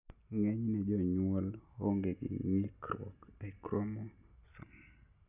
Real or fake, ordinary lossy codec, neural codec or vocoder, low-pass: real; none; none; 3.6 kHz